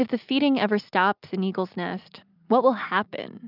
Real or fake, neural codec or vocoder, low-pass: real; none; 5.4 kHz